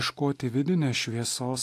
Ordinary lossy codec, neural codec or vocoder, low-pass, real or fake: AAC, 64 kbps; none; 14.4 kHz; real